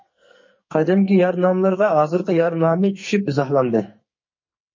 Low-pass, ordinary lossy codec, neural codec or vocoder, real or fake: 7.2 kHz; MP3, 32 kbps; codec, 44.1 kHz, 2.6 kbps, SNAC; fake